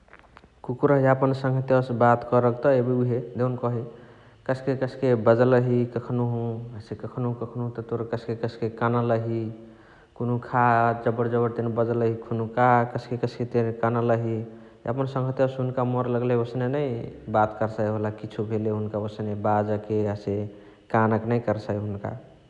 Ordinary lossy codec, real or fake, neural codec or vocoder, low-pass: none; real; none; 10.8 kHz